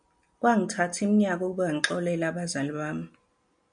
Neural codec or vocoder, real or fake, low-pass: none; real; 9.9 kHz